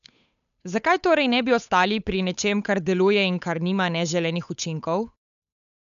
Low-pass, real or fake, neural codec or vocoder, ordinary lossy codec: 7.2 kHz; fake; codec, 16 kHz, 8 kbps, FunCodec, trained on Chinese and English, 25 frames a second; none